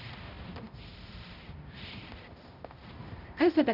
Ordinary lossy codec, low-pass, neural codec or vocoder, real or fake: none; 5.4 kHz; codec, 16 kHz, 0.5 kbps, X-Codec, HuBERT features, trained on balanced general audio; fake